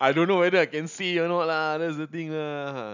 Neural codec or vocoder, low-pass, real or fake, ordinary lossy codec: none; 7.2 kHz; real; none